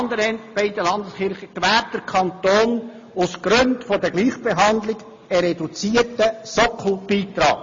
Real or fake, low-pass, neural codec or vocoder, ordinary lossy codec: real; 7.2 kHz; none; MP3, 32 kbps